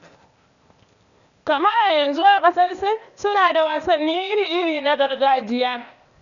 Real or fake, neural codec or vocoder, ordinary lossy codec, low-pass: fake; codec, 16 kHz, 0.8 kbps, ZipCodec; none; 7.2 kHz